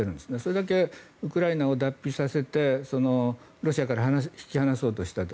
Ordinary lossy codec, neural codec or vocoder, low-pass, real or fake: none; none; none; real